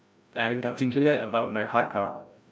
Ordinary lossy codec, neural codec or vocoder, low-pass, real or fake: none; codec, 16 kHz, 0.5 kbps, FreqCodec, larger model; none; fake